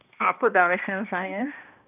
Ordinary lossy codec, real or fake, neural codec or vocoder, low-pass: none; fake; codec, 16 kHz, 1 kbps, X-Codec, HuBERT features, trained on balanced general audio; 3.6 kHz